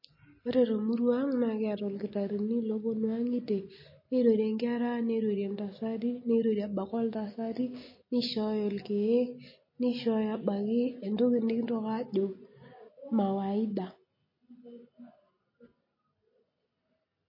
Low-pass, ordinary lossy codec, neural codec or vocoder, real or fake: 5.4 kHz; MP3, 24 kbps; none; real